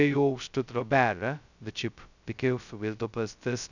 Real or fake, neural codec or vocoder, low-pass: fake; codec, 16 kHz, 0.2 kbps, FocalCodec; 7.2 kHz